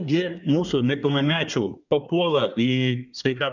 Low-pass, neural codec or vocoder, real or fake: 7.2 kHz; codec, 24 kHz, 1 kbps, SNAC; fake